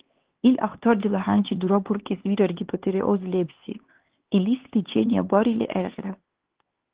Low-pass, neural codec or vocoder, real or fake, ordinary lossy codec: 3.6 kHz; codec, 16 kHz, 4 kbps, X-Codec, HuBERT features, trained on LibriSpeech; fake; Opus, 16 kbps